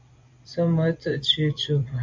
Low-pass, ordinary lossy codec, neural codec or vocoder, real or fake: 7.2 kHz; MP3, 64 kbps; vocoder, 44.1 kHz, 128 mel bands every 256 samples, BigVGAN v2; fake